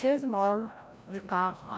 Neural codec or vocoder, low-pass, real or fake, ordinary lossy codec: codec, 16 kHz, 0.5 kbps, FreqCodec, larger model; none; fake; none